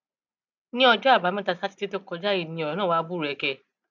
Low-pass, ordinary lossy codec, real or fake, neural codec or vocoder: 7.2 kHz; none; real; none